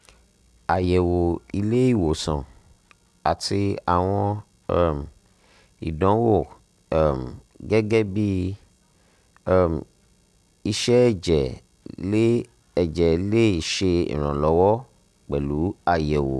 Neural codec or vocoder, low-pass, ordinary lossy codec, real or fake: none; none; none; real